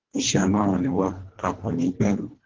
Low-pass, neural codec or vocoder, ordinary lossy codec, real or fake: 7.2 kHz; codec, 24 kHz, 1.5 kbps, HILCodec; Opus, 16 kbps; fake